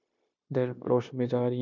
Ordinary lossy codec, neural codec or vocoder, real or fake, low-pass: MP3, 64 kbps; codec, 16 kHz, 0.9 kbps, LongCat-Audio-Codec; fake; 7.2 kHz